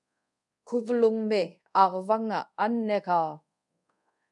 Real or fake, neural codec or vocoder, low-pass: fake; codec, 24 kHz, 0.5 kbps, DualCodec; 10.8 kHz